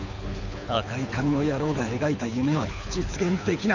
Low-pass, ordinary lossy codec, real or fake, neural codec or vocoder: 7.2 kHz; none; fake; codec, 24 kHz, 6 kbps, HILCodec